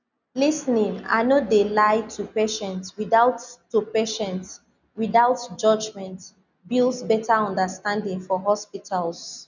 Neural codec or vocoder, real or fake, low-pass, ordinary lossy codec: none; real; 7.2 kHz; none